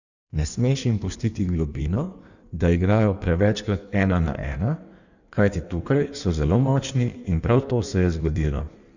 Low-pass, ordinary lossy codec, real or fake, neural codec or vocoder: 7.2 kHz; none; fake; codec, 16 kHz in and 24 kHz out, 1.1 kbps, FireRedTTS-2 codec